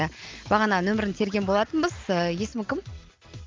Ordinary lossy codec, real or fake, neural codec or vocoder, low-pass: Opus, 24 kbps; real; none; 7.2 kHz